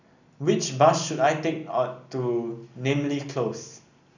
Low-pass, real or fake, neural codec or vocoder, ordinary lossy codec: 7.2 kHz; real; none; none